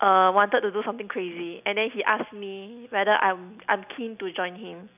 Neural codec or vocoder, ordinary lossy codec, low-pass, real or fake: none; none; 3.6 kHz; real